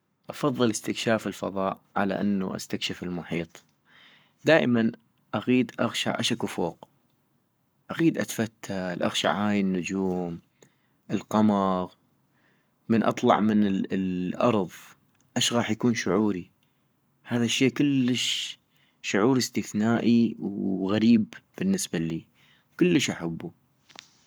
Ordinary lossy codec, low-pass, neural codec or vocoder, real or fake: none; none; codec, 44.1 kHz, 7.8 kbps, Pupu-Codec; fake